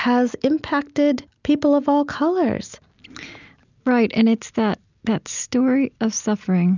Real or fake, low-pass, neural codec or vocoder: real; 7.2 kHz; none